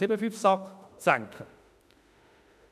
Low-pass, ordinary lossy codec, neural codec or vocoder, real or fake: 14.4 kHz; none; autoencoder, 48 kHz, 32 numbers a frame, DAC-VAE, trained on Japanese speech; fake